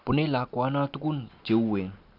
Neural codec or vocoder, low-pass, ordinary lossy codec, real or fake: none; 5.4 kHz; none; real